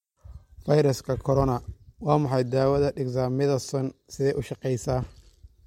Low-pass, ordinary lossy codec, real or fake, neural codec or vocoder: 19.8 kHz; MP3, 64 kbps; real; none